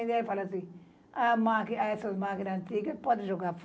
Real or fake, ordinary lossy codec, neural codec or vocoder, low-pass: real; none; none; none